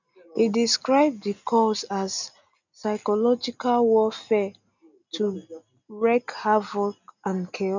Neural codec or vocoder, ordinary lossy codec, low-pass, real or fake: none; none; 7.2 kHz; real